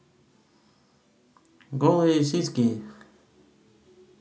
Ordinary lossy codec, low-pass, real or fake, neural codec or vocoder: none; none; real; none